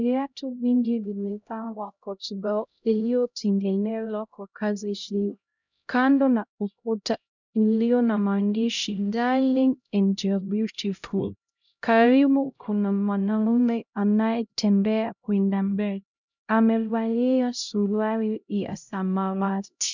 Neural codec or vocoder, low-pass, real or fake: codec, 16 kHz, 0.5 kbps, X-Codec, HuBERT features, trained on LibriSpeech; 7.2 kHz; fake